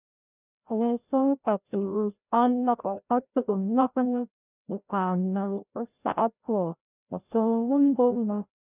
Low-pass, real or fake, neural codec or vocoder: 3.6 kHz; fake; codec, 16 kHz, 0.5 kbps, FreqCodec, larger model